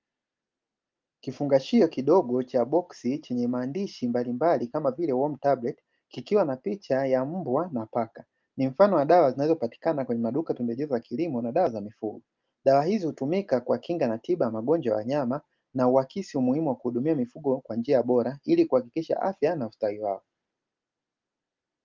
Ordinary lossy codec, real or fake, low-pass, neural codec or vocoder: Opus, 24 kbps; real; 7.2 kHz; none